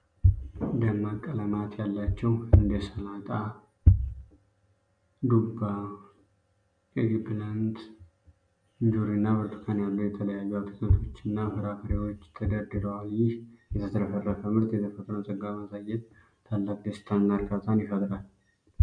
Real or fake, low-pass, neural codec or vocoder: real; 9.9 kHz; none